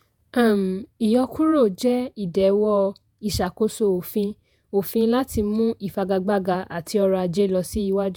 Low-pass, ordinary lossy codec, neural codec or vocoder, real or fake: none; none; vocoder, 48 kHz, 128 mel bands, Vocos; fake